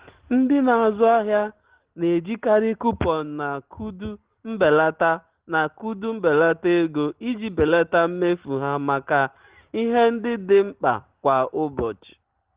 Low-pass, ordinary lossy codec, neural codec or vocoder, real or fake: 3.6 kHz; Opus, 16 kbps; none; real